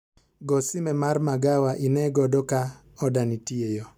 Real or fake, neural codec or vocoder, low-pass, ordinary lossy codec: real; none; 19.8 kHz; none